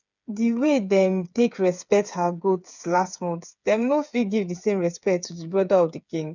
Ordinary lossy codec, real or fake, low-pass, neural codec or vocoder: none; fake; 7.2 kHz; codec, 16 kHz, 8 kbps, FreqCodec, smaller model